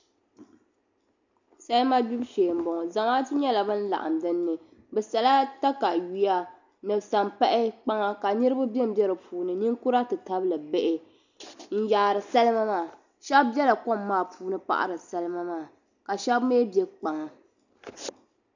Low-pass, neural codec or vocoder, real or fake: 7.2 kHz; none; real